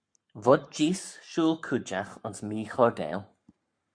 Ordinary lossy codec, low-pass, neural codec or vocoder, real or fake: MP3, 64 kbps; 9.9 kHz; codec, 44.1 kHz, 7.8 kbps, Pupu-Codec; fake